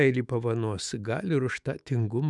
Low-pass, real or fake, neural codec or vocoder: 10.8 kHz; fake; autoencoder, 48 kHz, 128 numbers a frame, DAC-VAE, trained on Japanese speech